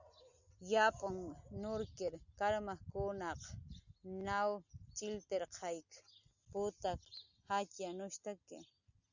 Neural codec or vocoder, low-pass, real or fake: none; 7.2 kHz; real